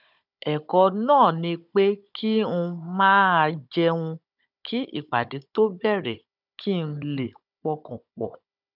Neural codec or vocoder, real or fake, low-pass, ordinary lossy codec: codec, 16 kHz, 16 kbps, FunCodec, trained on Chinese and English, 50 frames a second; fake; 5.4 kHz; none